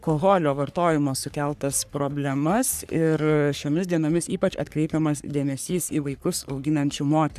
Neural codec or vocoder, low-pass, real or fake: codec, 44.1 kHz, 3.4 kbps, Pupu-Codec; 14.4 kHz; fake